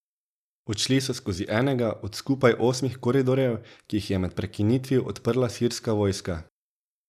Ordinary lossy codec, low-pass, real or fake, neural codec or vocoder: none; 14.4 kHz; real; none